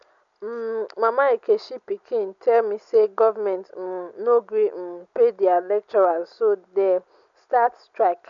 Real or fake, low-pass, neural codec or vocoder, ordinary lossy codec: real; 7.2 kHz; none; none